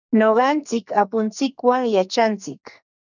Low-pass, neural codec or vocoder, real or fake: 7.2 kHz; codec, 44.1 kHz, 2.6 kbps, SNAC; fake